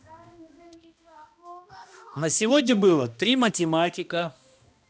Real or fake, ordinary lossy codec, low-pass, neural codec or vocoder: fake; none; none; codec, 16 kHz, 1 kbps, X-Codec, HuBERT features, trained on balanced general audio